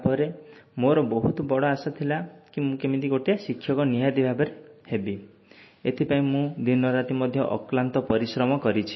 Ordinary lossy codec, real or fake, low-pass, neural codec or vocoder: MP3, 24 kbps; real; 7.2 kHz; none